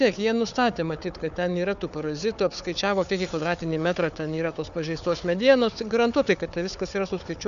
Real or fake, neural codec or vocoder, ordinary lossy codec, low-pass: fake; codec, 16 kHz, 4 kbps, FunCodec, trained on Chinese and English, 50 frames a second; MP3, 96 kbps; 7.2 kHz